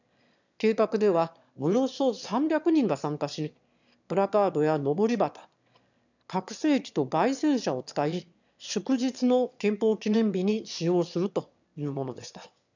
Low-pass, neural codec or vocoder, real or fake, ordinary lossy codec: 7.2 kHz; autoencoder, 22.05 kHz, a latent of 192 numbers a frame, VITS, trained on one speaker; fake; none